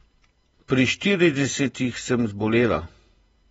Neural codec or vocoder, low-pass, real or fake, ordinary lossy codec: vocoder, 48 kHz, 128 mel bands, Vocos; 19.8 kHz; fake; AAC, 24 kbps